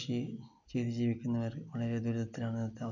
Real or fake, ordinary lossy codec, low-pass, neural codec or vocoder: real; none; 7.2 kHz; none